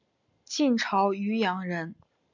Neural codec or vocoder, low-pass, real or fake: none; 7.2 kHz; real